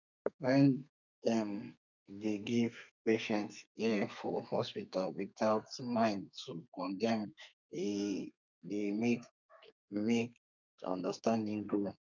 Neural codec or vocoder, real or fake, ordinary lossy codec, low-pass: codec, 32 kHz, 1.9 kbps, SNAC; fake; none; 7.2 kHz